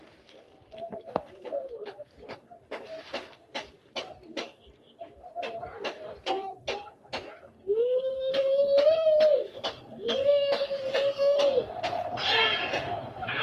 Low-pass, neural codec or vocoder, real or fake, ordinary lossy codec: 14.4 kHz; codec, 44.1 kHz, 3.4 kbps, Pupu-Codec; fake; Opus, 32 kbps